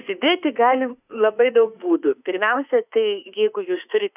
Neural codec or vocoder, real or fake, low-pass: autoencoder, 48 kHz, 32 numbers a frame, DAC-VAE, trained on Japanese speech; fake; 3.6 kHz